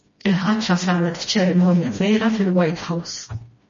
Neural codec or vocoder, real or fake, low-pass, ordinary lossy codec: codec, 16 kHz, 1 kbps, FreqCodec, smaller model; fake; 7.2 kHz; MP3, 32 kbps